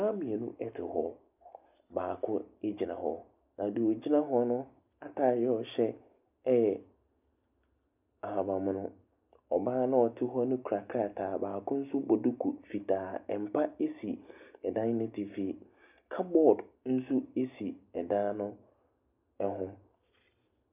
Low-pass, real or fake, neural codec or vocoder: 3.6 kHz; real; none